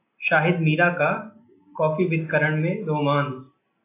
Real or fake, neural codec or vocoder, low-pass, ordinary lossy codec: real; none; 3.6 kHz; AAC, 24 kbps